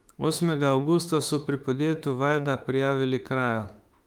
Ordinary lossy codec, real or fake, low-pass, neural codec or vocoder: Opus, 32 kbps; fake; 19.8 kHz; autoencoder, 48 kHz, 32 numbers a frame, DAC-VAE, trained on Japanese speech